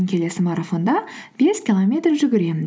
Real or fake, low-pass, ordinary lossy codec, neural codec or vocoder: real; none; none; none